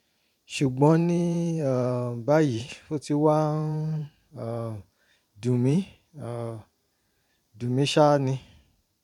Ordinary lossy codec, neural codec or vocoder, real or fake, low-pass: none; vocoder, 44.1 kHz, 128 mel bands every 512 samples, BigVGAN v2; fake; 19.8 kHz